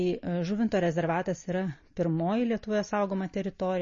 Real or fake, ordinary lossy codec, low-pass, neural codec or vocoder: real; MP3, 32 kbps; 7.2 kHz; none